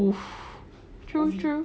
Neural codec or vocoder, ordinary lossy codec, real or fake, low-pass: none; none; real; none